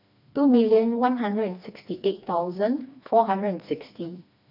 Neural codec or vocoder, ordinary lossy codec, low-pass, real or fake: codec, 16 kHz, 2 kbps, FreqCodec, smaller model; none; 5.4 kHz; fake